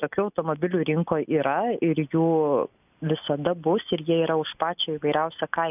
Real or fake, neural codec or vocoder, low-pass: real; none; 3.6 kHz